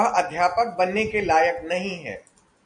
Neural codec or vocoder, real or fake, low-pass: none; real; 9.9 kHz